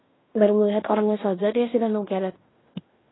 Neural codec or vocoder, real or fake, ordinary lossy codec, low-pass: codec, 16 kHz in and 24 kHz out, 0.9 kbps, LongCat-Audio-Codec, fine tuned four codebook decoder; fake; AAC, 16 kbps; 7.2 kHz